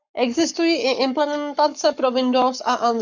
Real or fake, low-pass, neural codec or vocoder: fake; 7.2 kHz; codec, 44.1 kHz, 7.8 kbps, Pupu-Codec